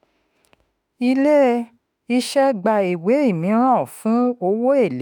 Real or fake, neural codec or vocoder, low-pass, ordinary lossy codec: fake; autoencoder, 48 kHz, 32 numbers a frame, DAC-VAE, trained on Japanese speech; none; none